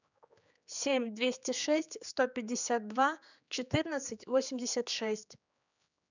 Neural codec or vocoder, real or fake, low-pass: codec, 16 kHz, 4 kbps, X-Codec, HuBERT features, trained on general audio; fake; 7.2 kHz